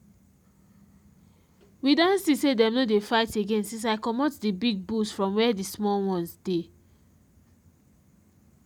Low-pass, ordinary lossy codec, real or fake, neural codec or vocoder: 19.8 kHz; none; real; none